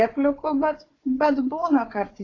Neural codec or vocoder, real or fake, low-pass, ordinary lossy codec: codec, 16 kHz, 4 kbps, FunCodec, trained on Chinese and English, 50 frames a second; fake; 7.2 kHz; AAC, 32 kbps